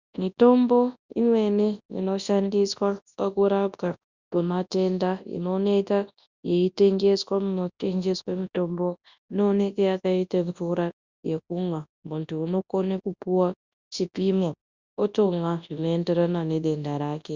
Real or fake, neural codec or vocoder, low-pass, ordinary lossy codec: fake; codec, 24 kHz, 0.9 kbps, WavTokenizer, large speech release; 7.2 kHz; Opus, 64 kbps